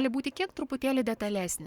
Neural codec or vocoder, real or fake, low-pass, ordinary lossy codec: codec, 44.1 kHz, 7.8 kbps, Pupu-Codec; fake; 19.8 kHz; Opus, 64 kbps